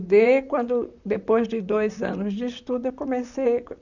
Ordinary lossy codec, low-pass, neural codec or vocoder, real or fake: none; 7.2 kHz; vocoder, 44.1 kHz, 128 mel bands, Pupu-Vocoder; fake